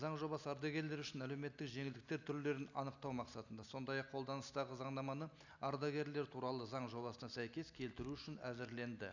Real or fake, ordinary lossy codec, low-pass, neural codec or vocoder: real; none; 7.2 kHz; none